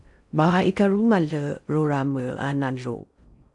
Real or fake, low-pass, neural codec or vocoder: fake; 10.8 kHz; codec, 16 kHz in and 24 kHz out, 0.6 kbps, FocalCodec, streaming, 4096 codes